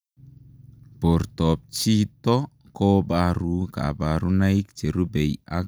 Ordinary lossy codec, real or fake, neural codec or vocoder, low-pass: none; real; none; none